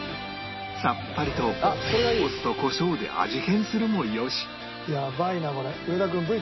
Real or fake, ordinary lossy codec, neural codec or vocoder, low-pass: real; MP3, 24 kbps; none; 7.2 kHz